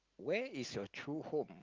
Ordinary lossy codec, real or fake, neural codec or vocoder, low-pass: Opus, 32 kbps; real; none; 7.2 kHz